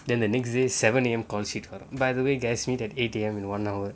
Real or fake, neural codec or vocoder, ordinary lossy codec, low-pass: real; none; none; none